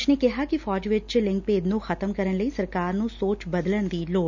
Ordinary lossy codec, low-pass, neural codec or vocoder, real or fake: none; 7.2 kHz; none; real